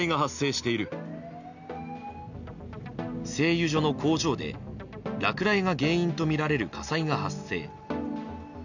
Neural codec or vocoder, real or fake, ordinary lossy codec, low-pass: none; real; none; 7.2 kHz